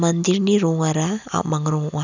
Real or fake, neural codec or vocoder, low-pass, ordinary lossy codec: real; none; 7.2 kHz; none